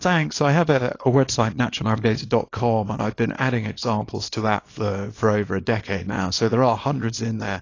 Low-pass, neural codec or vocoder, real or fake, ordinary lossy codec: 7.2 kHz; codec, 24 kHz, 0.9 kbps, WavTokenizer, small release; fake; AAC, 32 kbps